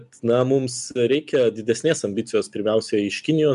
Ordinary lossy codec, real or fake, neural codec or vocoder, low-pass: MP3, 96 kbps; real; none; 10.8 kHz